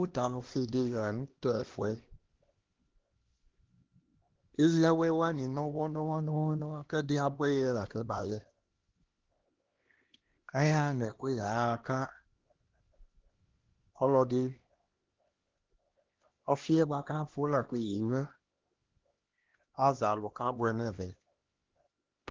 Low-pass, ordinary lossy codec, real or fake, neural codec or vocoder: 7.2 kHz; Opus, 16 kbps; fake; codec, 16 kHz, 1 kbps, X-Codec, HuBERT features, trained on LibriSpeech